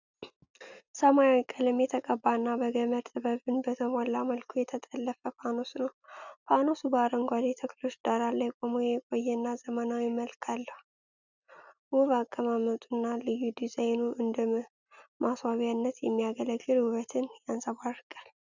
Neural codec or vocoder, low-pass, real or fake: none; 7.2 kHz; real